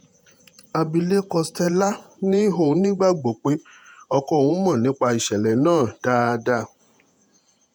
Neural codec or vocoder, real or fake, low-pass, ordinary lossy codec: vocoder, 48 kHz, 128 mel bands, Vocos; fake; none; none